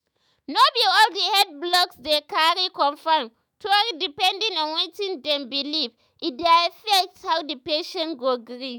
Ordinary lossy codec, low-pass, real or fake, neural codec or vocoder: none; none; fake; autoencoder, 48 kHz, 128 numbers a frame, DAC-VAE, trained on Japanese speech